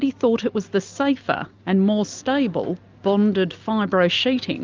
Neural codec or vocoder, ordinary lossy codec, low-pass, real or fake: none; Opus, 24 kbps; 7.2 kHz; real